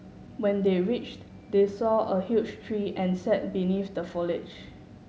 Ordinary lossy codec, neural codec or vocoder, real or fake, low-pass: none; none; real; none